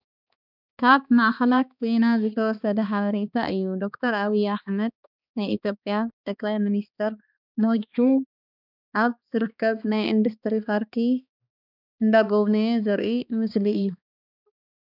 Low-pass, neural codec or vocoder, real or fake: 5.4 kHz; codec, 16 kHz, 2 kbps, X-Codec, HuBERT features, trained on balanced general audio; fake